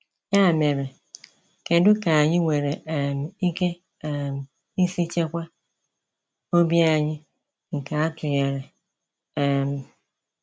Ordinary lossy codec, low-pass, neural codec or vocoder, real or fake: none; none; none; real